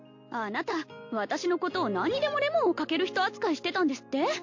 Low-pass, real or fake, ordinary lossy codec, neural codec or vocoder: 7.2 kHz; real; MP3, 48 kbps; none